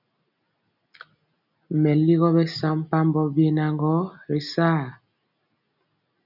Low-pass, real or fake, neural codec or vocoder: 5.4 kHz; real; none